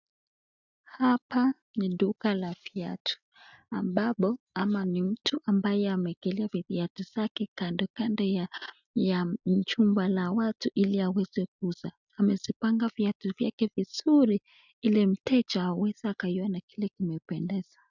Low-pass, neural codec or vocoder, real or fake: 7.2 kHz; none; real